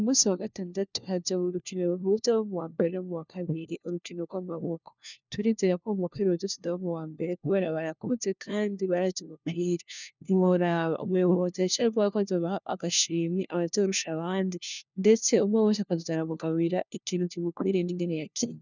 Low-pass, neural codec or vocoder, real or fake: 7.2 kHz; codec, 16 kHz, 1 kbps, FunCodec, trained on LibriTTS, 50 frames a second; fake